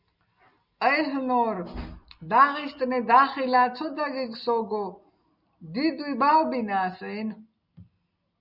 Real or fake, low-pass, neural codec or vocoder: real; 5.4 kHz; none